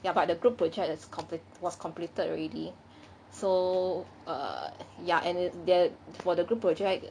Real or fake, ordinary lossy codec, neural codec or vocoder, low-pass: real; none; none; 9.9 kHz